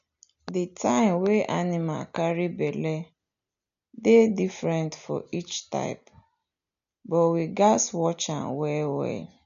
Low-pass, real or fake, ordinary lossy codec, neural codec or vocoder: 7.2 kHz; real; none; none